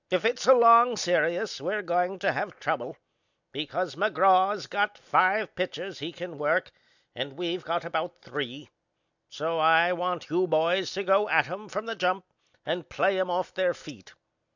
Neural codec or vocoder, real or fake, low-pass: none; real; 7.2 kHz